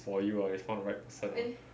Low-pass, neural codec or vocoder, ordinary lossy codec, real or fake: none; none; none; real